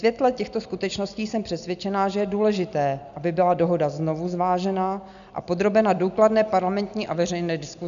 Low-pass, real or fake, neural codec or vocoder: 7.2 kHz; real; none